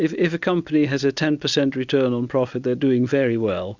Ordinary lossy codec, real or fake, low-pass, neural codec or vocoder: Opus, 64 kbps; real; 7.2 kHz; none